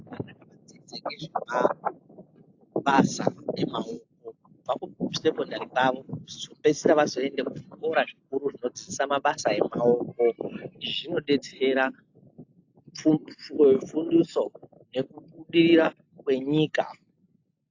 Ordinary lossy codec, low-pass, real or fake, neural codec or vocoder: AAC, 48 kbps; 7.2 kHz; real; none